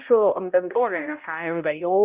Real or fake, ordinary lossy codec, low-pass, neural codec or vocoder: fake; Opus, 64 kbps; 3.6 kHz; codec, 16 kHz, 0.5 kbps, X-Codec, HuBERT features, trained on balanced general audio